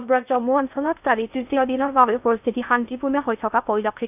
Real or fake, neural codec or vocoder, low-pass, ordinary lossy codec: fake; codec, 16 kHz in and 24 kHz out, 0.6 kbps, FocalCodec, streaming, 2048 codes; 3.6 kHz; none